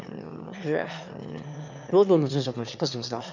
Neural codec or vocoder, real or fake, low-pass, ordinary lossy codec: autoencoder, 22.05 kHz, a latent of 192 numbers a frame, VITS, trained on one speaker; fake; 7.2 kHz; none